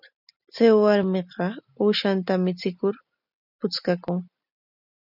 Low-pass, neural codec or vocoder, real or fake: 5.4 kHz; none; real